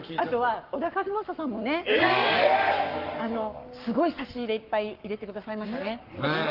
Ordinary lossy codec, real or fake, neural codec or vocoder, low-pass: Opus, 32 kbps; fake; codec, 44.1 kHz, 7.8 kbps, Pupu-Codec; 5.4 kHz